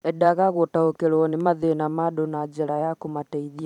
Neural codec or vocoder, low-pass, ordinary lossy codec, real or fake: vocoder, 44.1 kHz, 128 mel bands every 512 samples, BigVGAN v2; 19.8 kHz; none; fake